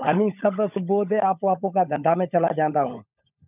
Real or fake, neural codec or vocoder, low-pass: fake; codec, 16 kHz, 16 kbps, FunCodec, trained on LibriTTS, 50 frames a second; 3.6 kHz